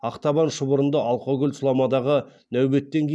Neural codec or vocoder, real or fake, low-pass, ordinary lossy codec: none; real; none; none